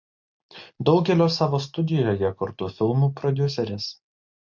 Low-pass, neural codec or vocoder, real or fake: 7.2 kHz; none; real